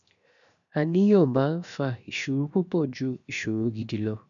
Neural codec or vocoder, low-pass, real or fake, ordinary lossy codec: codec, 16 kHz, 0.7 kbps, FocalCodec; 7.2 kHz; fake; none